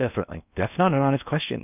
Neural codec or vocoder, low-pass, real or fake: codec, 16 kHz in and 24 kHz out, 0.8 kbps, FocalCodec, streaming, 65536 codes; 3.6 kHz; fake